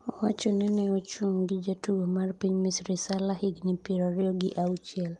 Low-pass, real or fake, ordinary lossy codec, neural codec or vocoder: 10.8 kHz; real; Opus, 32 kbps; none